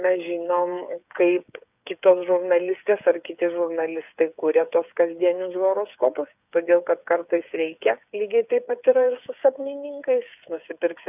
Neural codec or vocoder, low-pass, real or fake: codec, 16 kHz, 8 kbps, FreqCodec, smaller model; 3.6 kHz; fake